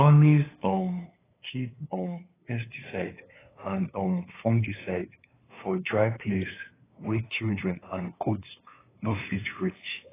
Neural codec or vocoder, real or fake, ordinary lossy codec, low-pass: codec, 16 kHz, 2 kbps, FunCodec, trained on LibriTTS, 25 frames a second; fake; AAC, 16 kbps; 3.6 kHz